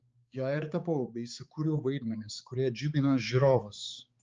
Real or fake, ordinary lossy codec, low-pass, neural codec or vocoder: fake; Opus, 24 kbps; 7.2 kHz; codec, 16 kHz, 4 kbps, X-Codec, HuBERT features, trained on balanced general audio